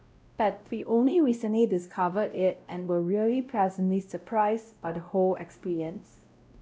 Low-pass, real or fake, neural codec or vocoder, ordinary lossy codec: none; fake; codec, 16 kHz, 0.5 kbps, X-Codec, WavLM features, trained on Multilingual LibriSpeech; none